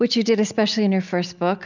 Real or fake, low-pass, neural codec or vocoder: real; 7.2 kHz; none